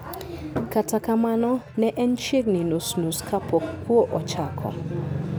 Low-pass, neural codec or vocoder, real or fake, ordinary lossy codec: none; none; real; none